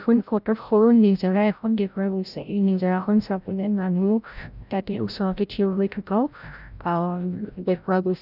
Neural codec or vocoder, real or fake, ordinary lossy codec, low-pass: codec, 16 kHz, 0.5 kbps, FreqCodec, larger model; fake; none; 5.4 kHz